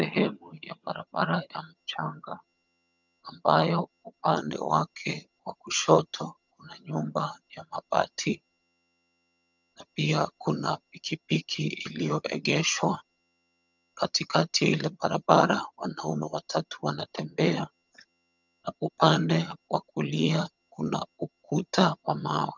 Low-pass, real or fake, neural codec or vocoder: 7.2 kHz; fake; vocoder, 22.05 kHz, 80 mel bands, HiFi-GAN